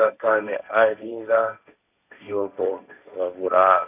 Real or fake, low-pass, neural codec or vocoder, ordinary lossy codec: fake; 3.6 kHz; codec, 16 kHz, 1.1 kbps, Voila-Tokenizer; none